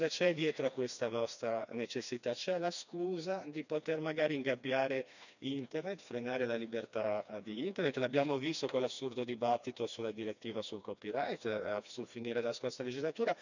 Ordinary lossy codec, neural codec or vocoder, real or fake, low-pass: none; codec, 16 kHz, 2 kbps, FreqCodec, smaller model; fake; 7.2 kHz